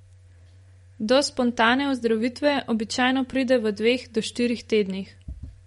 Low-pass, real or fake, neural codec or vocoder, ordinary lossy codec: 19.8 kHz; fake; vocoder, 44.1 kHz, 128 mel bands every 512 samples, BigVGAN v2; MP3, 48 kbps